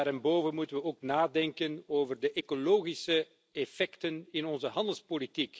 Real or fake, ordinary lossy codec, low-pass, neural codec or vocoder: real; none; none; none